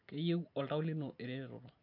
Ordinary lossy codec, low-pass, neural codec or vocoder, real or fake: MP3, 48 kbps; 5.4 kHz; none; real